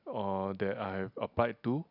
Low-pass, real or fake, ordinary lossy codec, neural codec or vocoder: 5.4 kHz; real; none; none